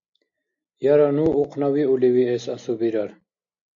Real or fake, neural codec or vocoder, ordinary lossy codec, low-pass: real; none; MP3, 48 kbps; 7.2 kHz